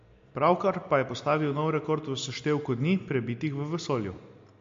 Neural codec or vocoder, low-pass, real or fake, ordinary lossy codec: none; 7.2 kHz; real; MP3, 48 kbps